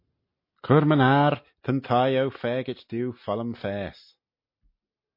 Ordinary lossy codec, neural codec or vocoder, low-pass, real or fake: MP3, 32 kbps; none; 5.4 kHz; real